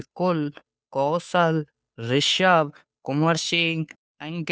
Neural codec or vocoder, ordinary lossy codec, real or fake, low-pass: codec, 16 kHz, 2 kbps, FunCodec, trained on Chinese and English, 25 frames a second; none; fake; none